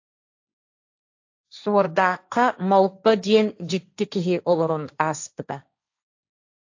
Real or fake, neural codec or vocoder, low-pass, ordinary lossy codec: fake; codec, 16 kHz, 1.1 kbps, Voila-Tokenizer; 7.2 kHz; AAC, 48 kbps